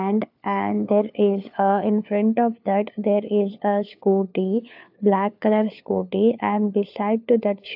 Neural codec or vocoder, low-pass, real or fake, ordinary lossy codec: codec, 16 kHz, 4 kbps, FunCodec, trained on LibriTTS, 50 frames a second; 5.4 kHz; fake; AAC, 48 kbps